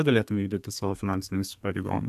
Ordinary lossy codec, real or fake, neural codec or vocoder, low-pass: MP3, 96 kbps; fake; codec, 32 kHz, 1.9 kbps, SNAC; 14.4 kHz